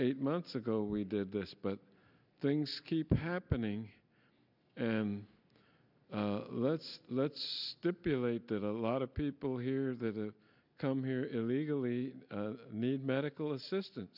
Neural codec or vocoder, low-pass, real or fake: none; 5.4 kHz; real